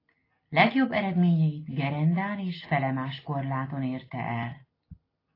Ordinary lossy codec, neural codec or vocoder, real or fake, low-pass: AAC, 24 kbps; none; real; 5.4 kHz